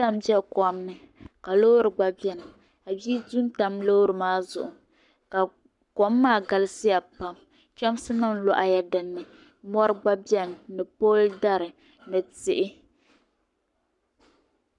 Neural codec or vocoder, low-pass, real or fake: codec, 44.1 kHz, 7.8 kbps, Pupu-Codec; 10.8 kHz; fake